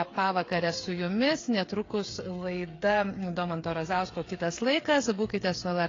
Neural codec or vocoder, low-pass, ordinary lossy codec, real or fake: codec, 16 kHz, 8 kbps, FreqCodec, smaller model; 7.2 kHz; AAC, 32 kbps; fake